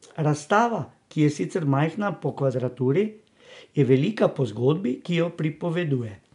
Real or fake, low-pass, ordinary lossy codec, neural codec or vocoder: real; 10.8 kHz; none; none